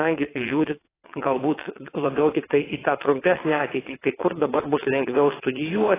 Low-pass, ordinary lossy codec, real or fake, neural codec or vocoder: 3.6 kHz; AAC, 16 kbps; fake; vocoder, 22.05 kHz, 80 mel bands, WaveNeXt